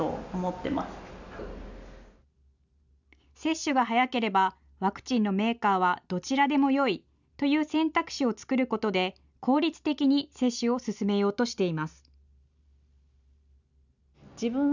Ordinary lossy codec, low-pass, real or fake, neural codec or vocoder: none; 7.2 kHz; real; none